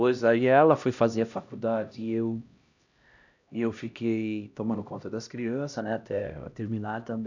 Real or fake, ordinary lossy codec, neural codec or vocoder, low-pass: fake; none; codec, 16 kHz, 1 kbps, X-Codec, HuBERT features, trained on LibriSpeech; 7.2 kHz